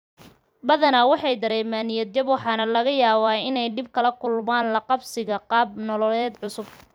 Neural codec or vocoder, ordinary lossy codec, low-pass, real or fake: vocoder, 44.1 kHz, 128 mel bands every 256 samples, BigVGAN v2; none; none; fake